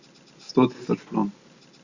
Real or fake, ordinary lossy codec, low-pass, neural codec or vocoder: real; Opus, 64 kbps; 7.2 kHz; none